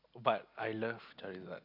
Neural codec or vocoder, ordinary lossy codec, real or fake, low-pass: none; AAC, 48 kbps; real; 5.4 kHz